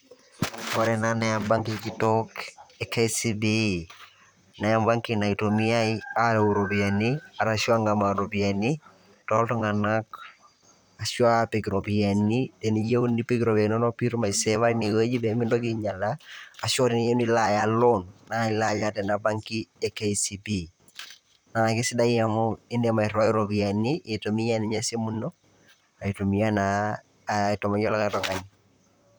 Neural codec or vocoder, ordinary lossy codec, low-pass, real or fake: vocoder, 44.1 kHz, 128 mel bands, Pupu-Vocoder; none; none; fake